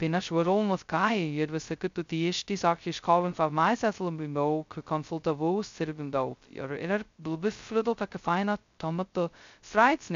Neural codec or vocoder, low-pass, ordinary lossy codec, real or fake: codec, 16 kHz, 0.2 kbps, FocalCodec; 7.2 kHz; MP3, 64 kbps; fake